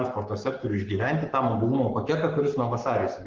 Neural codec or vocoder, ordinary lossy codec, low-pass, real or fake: codec, 44.1 kHz, 7.8 kbps, Pupu-Codec; Opus, 16 kbps; 7.2 kHz; fake